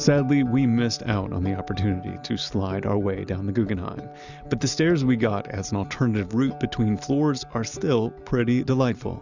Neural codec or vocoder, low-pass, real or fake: none; 7.2 kHz; real